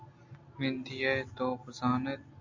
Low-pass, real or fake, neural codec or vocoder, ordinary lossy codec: 7.2 kHz; real; none; MP3, 48 kbps